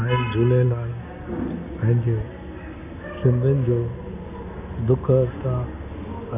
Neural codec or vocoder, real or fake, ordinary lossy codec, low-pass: none; real; none; 3.6 kHz